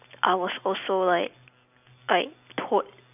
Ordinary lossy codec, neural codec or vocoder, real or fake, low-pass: none; none; real; 3.6 kHz